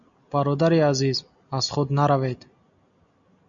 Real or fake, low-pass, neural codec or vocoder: real; 7.2 kHz; none